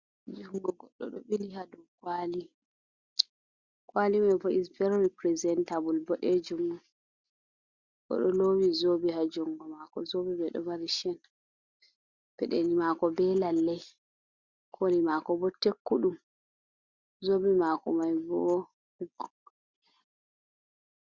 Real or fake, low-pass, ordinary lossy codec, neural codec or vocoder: real; 7.2 kHz; Opus, 64 kbps; none